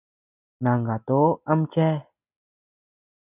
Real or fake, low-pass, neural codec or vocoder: real; 3.6 kHz; none